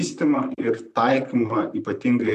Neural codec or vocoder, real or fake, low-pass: vocoder, 44.1 kHz, 128 mel bands, Pupu-Vocoder; fake; 14.4 kHz